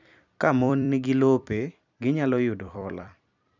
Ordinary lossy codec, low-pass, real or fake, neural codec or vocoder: none; 7.2 kHz; fake; vocoder, 44.1 kHz, 128 mel bands every 256 samples, BigVGAN v2